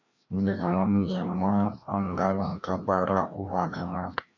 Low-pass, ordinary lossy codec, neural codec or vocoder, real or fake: 7.2 kHz; MP3, 48 kbps; codec, 16 kHz, 1 kbps, FreqCodec, larger model; fake